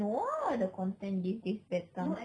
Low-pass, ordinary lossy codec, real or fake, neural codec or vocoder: 9.9 kHz; none; real; none